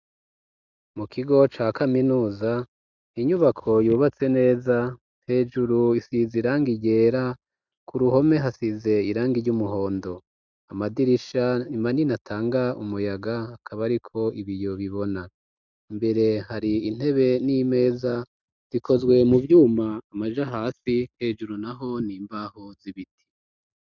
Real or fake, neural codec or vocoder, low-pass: real; none; 7.2 kHz